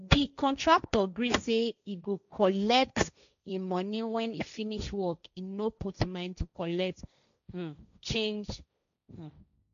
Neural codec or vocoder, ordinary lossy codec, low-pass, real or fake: codec, 16 kHz, 1.1 kbps, Voila-Tokenizer; none; 7.2 kHz; fake